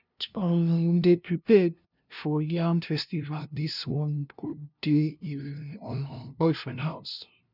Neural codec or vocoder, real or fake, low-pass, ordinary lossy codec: codec, 16 kHz, 0.5 kbps, FunCodec, trained on LibriTTS, 25 frames a second; fake; 5.4 kHz; none